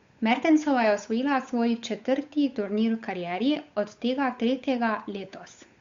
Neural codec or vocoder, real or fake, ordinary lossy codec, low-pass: codec, 16 kHz, 8 kbps, FunCodec, trained on Chinese and English, 25 frames a second; fake; Opus, 64 kbps; 7.2 kHz